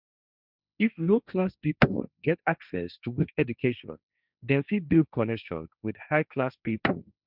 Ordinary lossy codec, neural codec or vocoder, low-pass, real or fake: none; codec, 16 kHz, 1.1 kbps, Voila-Tokenizer; 5.4 kHz; fake